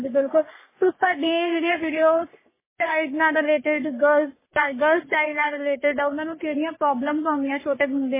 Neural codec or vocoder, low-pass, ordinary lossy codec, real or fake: codec, 44.1 kHz, 3.4 kbps, Pupu-Codec; 3.6 kHz; MP3, 16 kbps; fake